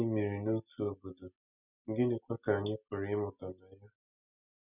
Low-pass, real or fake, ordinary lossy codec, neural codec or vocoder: 3.6 kHz; real; none; none